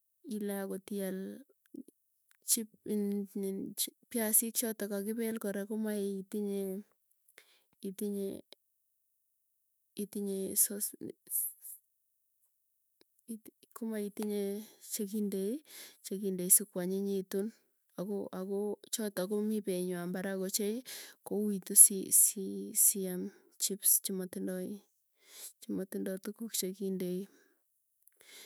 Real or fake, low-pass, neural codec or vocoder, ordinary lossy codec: fake; none; autoencoder, 48 kHz, 128 numbers a frame, DAC-VAE, trained on Japanese speech; none